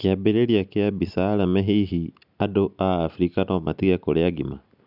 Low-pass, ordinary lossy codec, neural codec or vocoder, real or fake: 5.4 kHz; none; none; real